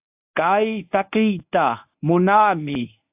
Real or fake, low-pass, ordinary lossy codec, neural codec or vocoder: fake; 3.6 kHz; AAC, 32 kbps; codec, 24 kHz, 0.9 kbps, WavTokenizer, medium speech release version 1